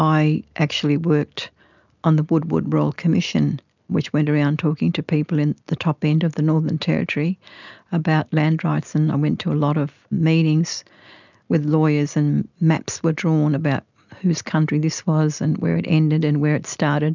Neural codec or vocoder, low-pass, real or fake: none; 7.2 kHz; real